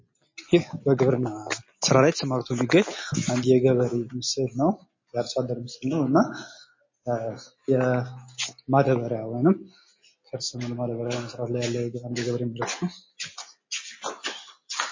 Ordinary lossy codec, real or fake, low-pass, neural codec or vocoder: MP3, 32 kbps; real; 7.2 kHz; none